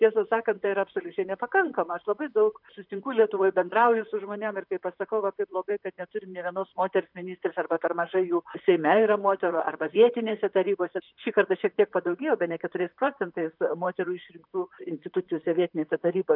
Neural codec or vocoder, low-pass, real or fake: vocoder, 44.1 kHz, 128 mel bands, Pupu-Vocoder; 5.4 kHz; fake